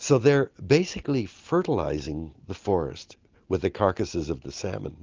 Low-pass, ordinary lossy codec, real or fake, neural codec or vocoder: 7.2 kHz; Opus, 24 kbps; real; none